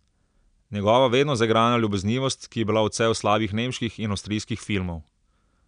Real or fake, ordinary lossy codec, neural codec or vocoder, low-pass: real; none; none; 9.9 kHz